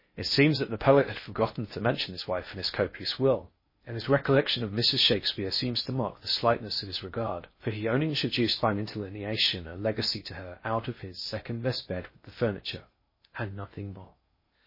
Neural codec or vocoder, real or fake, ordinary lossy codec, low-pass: codec, 16 kHz in and 24 kHz out, 0.6 kbps, FocalCodec, streaming, 4096 codes; fake; MP3, 24 kbps; 5.4 kHz